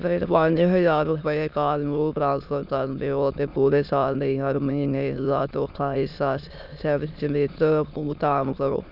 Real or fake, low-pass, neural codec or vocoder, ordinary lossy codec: fake; 5.4 kHz; autoencoder, 22.05 kHz, a latent of 192 numbers a frame, VITS, trained on many speakers; none